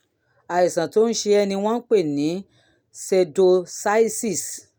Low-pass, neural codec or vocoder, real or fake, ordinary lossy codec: none; none; real; none